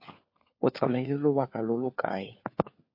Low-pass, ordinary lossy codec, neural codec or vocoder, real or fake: 5.4 kHz; MP3, 32 kbps; codec, 16 kHz, 4 kbps, FunCodec, trained on LibriTTS, 50 frames a second; fake